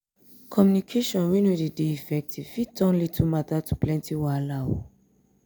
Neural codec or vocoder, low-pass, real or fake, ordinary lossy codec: none; none; real; none